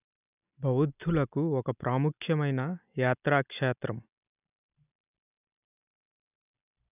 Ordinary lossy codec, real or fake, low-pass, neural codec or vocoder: none; real; 3.6 kHz; none